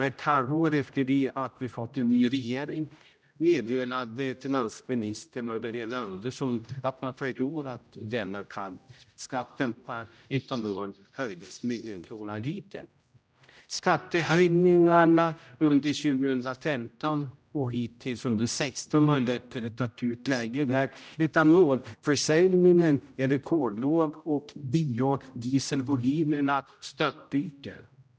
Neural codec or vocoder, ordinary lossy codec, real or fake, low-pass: codec, 16 kHz, 0.5 kbps, X-Codec, HuBERT features, trained on general audio; none; fake; none